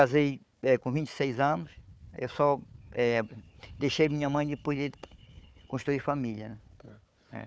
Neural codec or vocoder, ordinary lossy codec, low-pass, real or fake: codec, 16 kHz, 4 kbps, FunCodec, trained on Chinese and English, 50 frames a second; none; none; fake